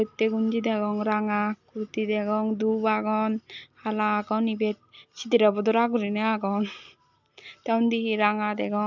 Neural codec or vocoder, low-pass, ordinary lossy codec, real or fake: none; 7.2 kHz; none; real